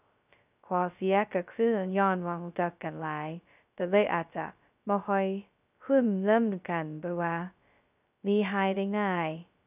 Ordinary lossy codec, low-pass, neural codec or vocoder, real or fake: none; 3.6 kHz; codec, 16 kHz, 0.2 kbps, FocalCodec; fake